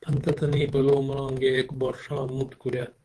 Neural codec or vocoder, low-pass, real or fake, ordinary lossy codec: codec, 24 kHz, 3.1 kbps, DualCodec; 10.8 kHz; fake; Opus, 16 kbps